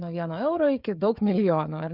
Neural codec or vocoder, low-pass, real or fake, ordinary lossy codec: codec, 16 kHz, 16 kbps, FreqCodec, smaller model; 5.4 kHz; fake; Opus, 64 kbps